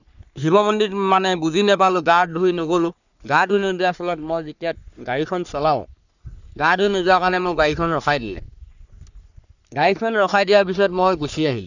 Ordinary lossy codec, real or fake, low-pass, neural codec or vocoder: none; fake; 7.2 kHz; codec, 44.1 kHz, 3.4 kbps, Pupu-Codec